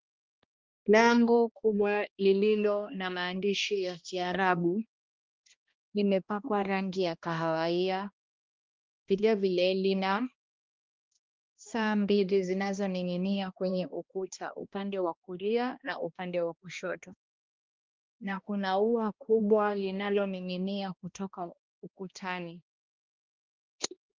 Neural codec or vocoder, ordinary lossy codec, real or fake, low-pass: codec, 16 kHz, 1 kbps, X-Codec, HuBERT features, trained on balanced general audio; Opus, 32 kbps; fake; 7.2 kHz